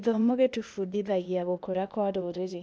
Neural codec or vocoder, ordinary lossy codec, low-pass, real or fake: codec, 16 kHz, 0.8 kbps, ZipCodec; none; none; fake